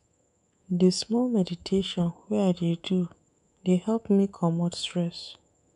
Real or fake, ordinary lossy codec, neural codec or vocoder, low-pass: fake; none; codec, 24 kHz, 3.1 kbps, DualCodec; 10.8 kHz